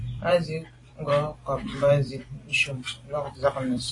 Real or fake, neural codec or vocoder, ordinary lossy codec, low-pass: fake; vocoder, 44.1 kHz, 128 mel bands every 256 samples, BigVGAN v2; AAC, 32 kbps; 10.8 kHz